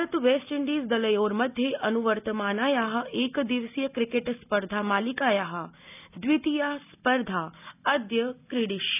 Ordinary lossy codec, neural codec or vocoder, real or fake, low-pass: none; none; real; 3.6 kHz